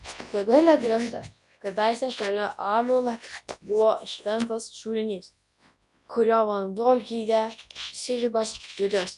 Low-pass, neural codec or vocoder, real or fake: 10.8 kHz; codec, 24 kHz, 0.9 kbps, WavTokenizer, large speech release; fake